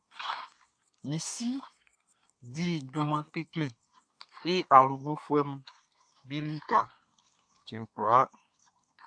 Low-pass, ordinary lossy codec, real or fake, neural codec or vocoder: 9.9 kHz; AAC, 64 kbps; fake; codec, 24 kHz, 1 kbps, SNAC